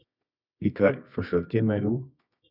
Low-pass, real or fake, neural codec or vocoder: 5.4 kHz; fake; codec, 24 kHz, 0.9 kbps, WavTokenizer, medium music audio release